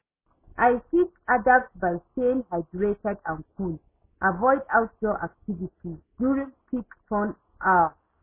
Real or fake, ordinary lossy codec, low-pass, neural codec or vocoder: real; MP3, 16 kbps; 3.6 kHz; none